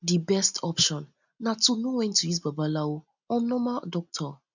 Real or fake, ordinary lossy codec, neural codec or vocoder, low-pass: real; none; none; 7.2 kHz